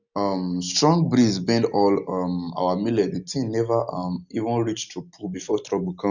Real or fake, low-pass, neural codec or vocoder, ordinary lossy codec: real; 7.2 kHz; none; none